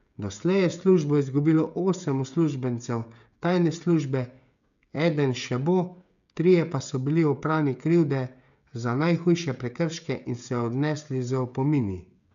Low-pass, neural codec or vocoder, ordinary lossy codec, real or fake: 7.2 kHz; codec, 16 kHz, 16 kbps, FreqCodec, smaller model; none; fake